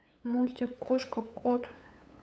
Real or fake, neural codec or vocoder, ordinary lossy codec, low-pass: fake; codec, 16 kHz, 8 kbps, FunCodec, trained on LibriTTS, 25 frames a second; none; none